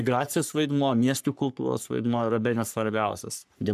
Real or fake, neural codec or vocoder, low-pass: fake; codec, 44.1 kHz, 3.4 kbps, Pupu-Codec; 14.4 kHz